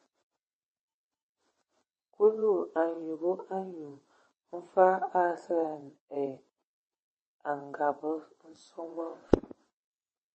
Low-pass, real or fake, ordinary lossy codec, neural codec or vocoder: 9.9 kHz; fake; MP3, 32 kbps; vocoder, 22.05 kHz, 80 mel bands, WaveNeXt